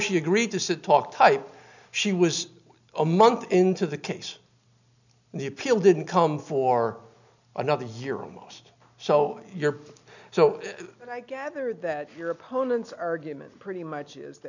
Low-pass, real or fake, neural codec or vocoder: 7.2 kHz; real; none